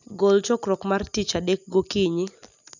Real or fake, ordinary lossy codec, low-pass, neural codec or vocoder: real; none; 7.2 kHz; none